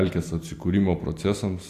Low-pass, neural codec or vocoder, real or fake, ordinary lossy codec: 14.4 kHz; none; real; AAC, 64 kbps